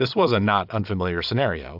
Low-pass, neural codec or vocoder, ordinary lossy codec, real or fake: 5.4 kHz; vocoder, 22.05 kHz, 80 mel bands, Vocos; AAC, 48 kbps; fake